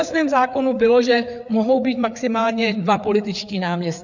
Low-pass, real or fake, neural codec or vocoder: 7.2 kHz; fake; codec, 16 kHz, 4 kbps, FreqCodec, larger model